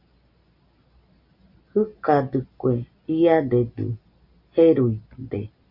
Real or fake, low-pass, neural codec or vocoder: real; 5.4 kHz; none